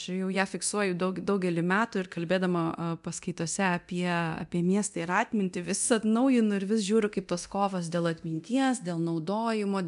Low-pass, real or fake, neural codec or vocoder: 10.8 kHz; fake; codec, 24 kHz, 0.9 kbps, DualCodec